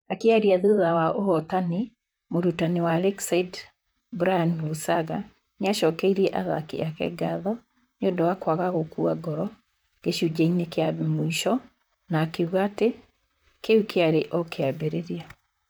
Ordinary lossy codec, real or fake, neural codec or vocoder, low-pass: none; fake; vocoder, 44.1 kHz, 128 mel bands, Pupu-Vocoder; none